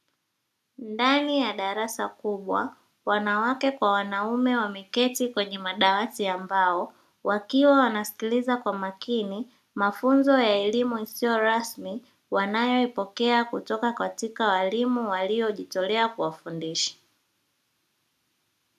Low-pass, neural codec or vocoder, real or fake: 14.4 kHz; none; real